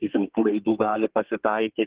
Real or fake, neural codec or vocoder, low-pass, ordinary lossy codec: fake; codec, 32 kHz, 1.9 kbps, SNAC; 3.6 kHz; Opus, 16 kbps